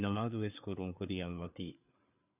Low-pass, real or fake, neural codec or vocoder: 3.6 kHz; fake; codec, 16 kHz, 4 kbps, FreqCodec, larger model